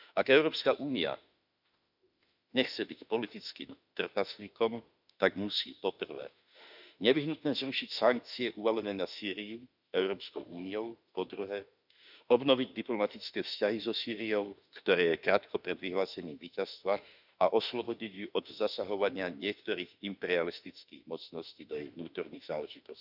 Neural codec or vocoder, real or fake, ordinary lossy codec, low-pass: autoencoder, 48 kHz, 32 numbers a frame, DAC-VAE, trained on Japanese speech; fake; none; 5.4 kHz